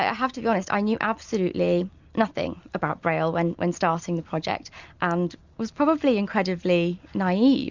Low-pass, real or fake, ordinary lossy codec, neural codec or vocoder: 7.2 kHz; real; Opus, 64 kbps; none